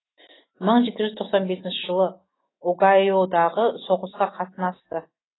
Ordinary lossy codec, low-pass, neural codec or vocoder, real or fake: AAC, 16 kbps; 7.2 kHz; autoencoder, 48 kHz, 128 numbers a frame, DAC-VAE, trained on Japanese speech; fake